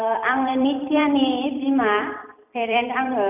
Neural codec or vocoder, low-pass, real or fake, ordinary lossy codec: vocoder, 44.1 kHz, 128 mel bands every 256 samples, BigVGAN v2; 3.6 kHz; fake; none